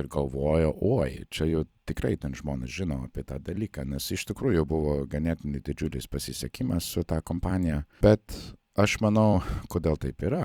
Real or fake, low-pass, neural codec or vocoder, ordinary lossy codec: fake; 19.8 kHz; vocoder, 44.1 kHz, 128 mel bands every 256 samples, BigVGAN v2; Opus, 64 kbps